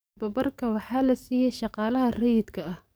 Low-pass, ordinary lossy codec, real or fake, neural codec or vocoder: none; none; fake; codec, 44.1 kHz, 7.8 kbps, DAC